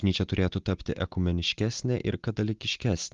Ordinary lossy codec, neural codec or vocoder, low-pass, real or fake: Opus, 32 kbps; none; 7.2 kHz; real